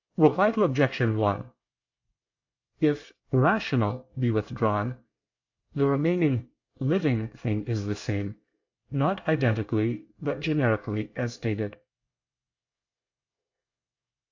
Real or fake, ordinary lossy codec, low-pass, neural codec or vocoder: fake; AAC, 48 kbps; 7.2 kHz; codec, 24 kHz, 1 kbps, SNAC